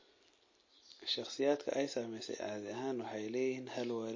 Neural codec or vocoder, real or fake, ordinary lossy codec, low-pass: none; real; MP3, 32 kbps; 7.2 kHz